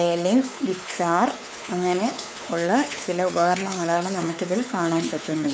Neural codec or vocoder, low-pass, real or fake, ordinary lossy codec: codec, 16 kHz, 4 kbps, X-Codec, WavLM features, trained on Multilingual LibriSpeech; none; fake; none